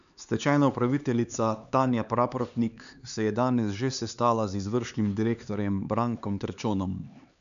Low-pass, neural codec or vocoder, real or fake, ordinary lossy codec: 7.2 kHz; codec, 16 kHz, 4 kbps, X-Codec, HuBERT features, trained on LibriSpeech; fake; none